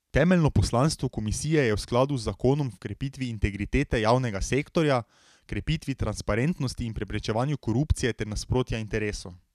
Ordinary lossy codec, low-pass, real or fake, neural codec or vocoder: none; 14.4 kHz; real; none